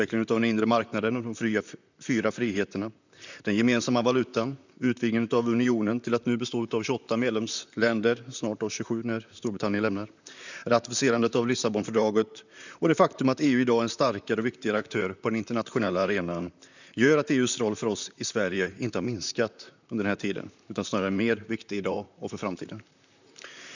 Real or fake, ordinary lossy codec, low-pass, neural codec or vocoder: fake; none; 7.2 kHz; vocoder, 44.1 kHz, 128 mel bands, Pupu-Vocoder